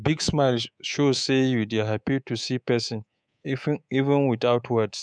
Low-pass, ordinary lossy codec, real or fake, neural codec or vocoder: 9.9 kHz; none; fake; codec, 24 kHz, 3.1 kbps, DualCodec